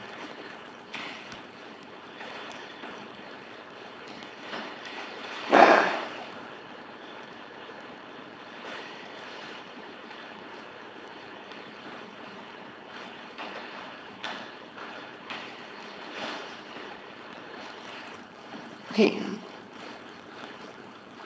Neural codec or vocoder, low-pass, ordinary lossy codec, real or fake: codec, 16 kHz, 4.8 kbps, FACodec; none; none; fake